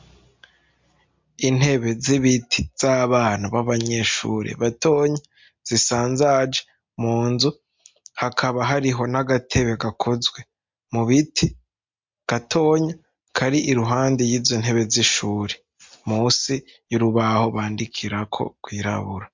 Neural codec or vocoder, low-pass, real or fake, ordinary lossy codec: none; 7.2 kHz; real; MP3, 64 kbps